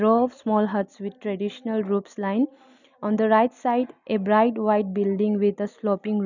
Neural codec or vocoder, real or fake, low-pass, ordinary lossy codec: none; real; 7.2 kHz; none